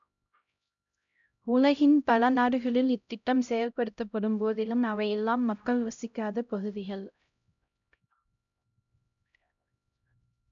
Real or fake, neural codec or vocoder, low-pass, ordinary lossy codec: fake; codec, 16 kHz, 0.5 kbps, X-Codec, HuBERT features, trained on LibriSpeech; 7.2 kHz; none